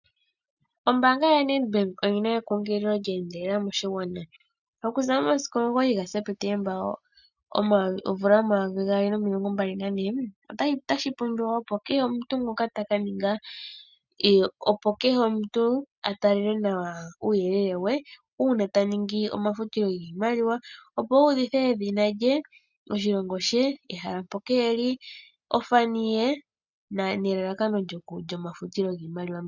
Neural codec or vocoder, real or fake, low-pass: none; real; 7.2 kHz